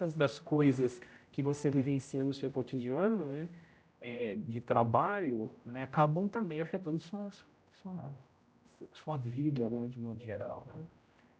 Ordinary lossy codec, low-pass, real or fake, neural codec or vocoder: none; none; fake; codec, 16 kHz, 0.5 kbps, X-Codec, HuBERT features, trained on general audio